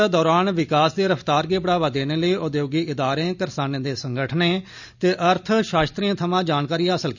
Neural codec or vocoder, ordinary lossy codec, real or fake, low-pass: none; none; real; 7.2 kHz